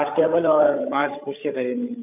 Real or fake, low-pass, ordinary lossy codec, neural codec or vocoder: fake; 3.6 kHz; none; codec, 16 kHz, 8 kbps, FreqCodec, larger model